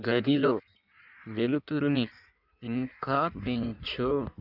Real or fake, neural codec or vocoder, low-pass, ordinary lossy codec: fake; codec, 16 kHz in and 24 kHz out, 1.1 kbps, FireRedTTS-2 codec; 5.4 kHz; none